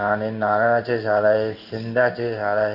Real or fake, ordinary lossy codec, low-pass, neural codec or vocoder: fake; none; 5.4 kHz; codec, 16 kHz in and 24 kHz out, 1 kbps, XY-Tokenizer